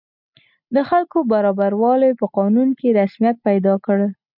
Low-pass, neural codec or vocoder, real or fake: 5.4 kHz; none; real